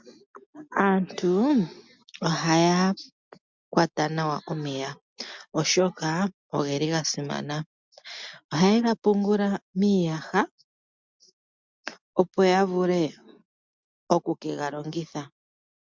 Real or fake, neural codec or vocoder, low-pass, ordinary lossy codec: real; none; 7.2 kHz; MP3, 64 kbps